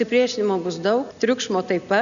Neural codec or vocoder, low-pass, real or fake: none; 7.2 kHz; real